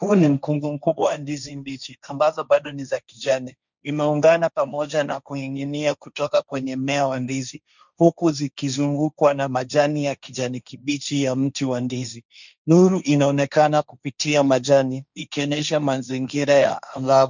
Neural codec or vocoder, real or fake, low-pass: codec, 16 kHz, 1.1 kbps, Voila-Tokenizer; fake; 7.2 kHz